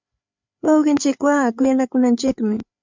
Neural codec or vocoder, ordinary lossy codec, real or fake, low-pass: codec, 16 kHz, 4 kbps, FreqCodec, larger model; MP3, 64 kbps; fake; 7.2 kHz